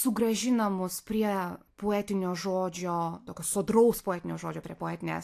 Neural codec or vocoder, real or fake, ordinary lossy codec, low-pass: none; real; AAC, 64 kbps; 14.4 kHz